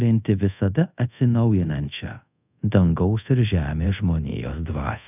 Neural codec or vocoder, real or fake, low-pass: codec, 24 kHz, 0.5 kbps, DualCodec; fake; 3.6 kHz